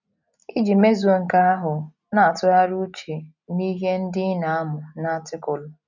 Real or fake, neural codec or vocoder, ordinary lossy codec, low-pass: real; none; none; 7.2 kHz